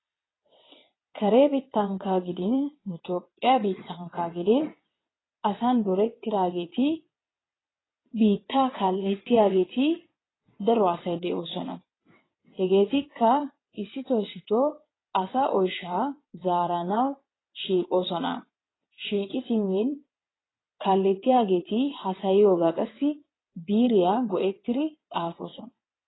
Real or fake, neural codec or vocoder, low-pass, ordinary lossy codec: fake; vocoder, 22.05 kHz, 80 mel bands, WaveNeXt; 7.2 kHz; AAC, 16 kbps